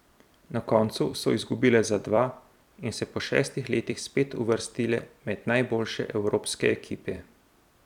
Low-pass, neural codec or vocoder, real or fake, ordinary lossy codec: 19.8 kHz; vocoder, 44.1 kHz, 128 mel bands every 256 samples, BigVGAN v2; fake; none